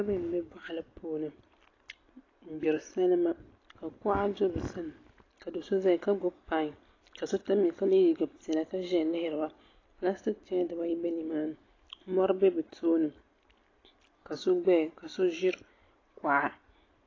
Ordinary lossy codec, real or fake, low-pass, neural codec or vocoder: AAC, 32 kbps; real; 7.2 kHz; none